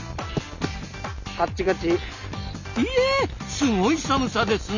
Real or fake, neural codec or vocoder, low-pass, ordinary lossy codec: real; none; 7.2 kHz; none